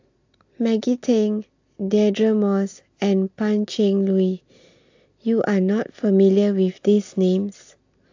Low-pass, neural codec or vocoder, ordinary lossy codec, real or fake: 7.2 kHz; none; AAC, 48 kbps; real